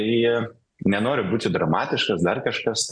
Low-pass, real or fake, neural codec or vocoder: 9.9 kHz; real; none